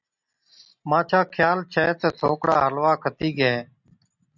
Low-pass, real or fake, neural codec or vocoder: 7.2 kHz; real; none